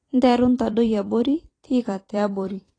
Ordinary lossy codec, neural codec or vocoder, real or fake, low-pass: AAC, 32 kbps; vocoder, 44.1 kHz, 128 mel bands every 256 samples, BigVGAN v2; fake; 9.9 kHz